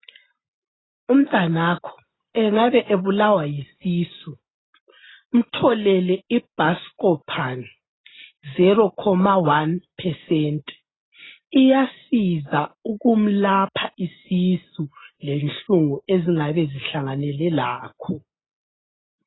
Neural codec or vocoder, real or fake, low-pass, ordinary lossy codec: none; real; 7.2 kHz; AAC, 16 kbps